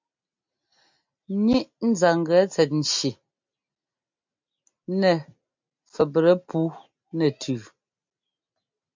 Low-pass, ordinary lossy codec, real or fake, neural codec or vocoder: 7.2 kHz; MP3, 64 kbps; real; none